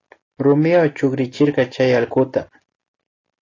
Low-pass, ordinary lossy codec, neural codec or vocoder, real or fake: 7.2 kHz; AAC, 32 kbps; none; real